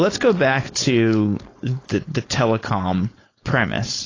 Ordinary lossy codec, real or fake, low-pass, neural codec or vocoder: AAC, 32 kbps; fake; 7.2 kHz; codec, 16 kHz, 4.8 kbps, FACodec